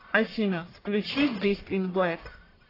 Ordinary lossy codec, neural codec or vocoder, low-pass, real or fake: AAC, 24 kbps; codec, 44.1 kHz, 1.7 kbps, Pupu-Codec; 5.4 kHz; fake